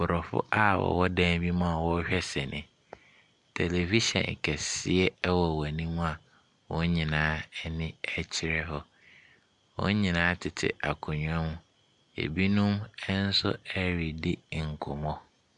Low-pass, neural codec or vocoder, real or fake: 10.8 kHz; none; real